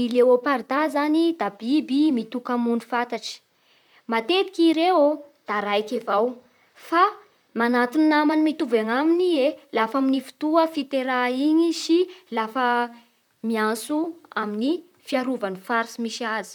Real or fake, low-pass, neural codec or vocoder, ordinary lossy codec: fake; 19.8 kHz; vocoder, 44.1 kHz, 128 mel bands, Pupu-Vocoder; none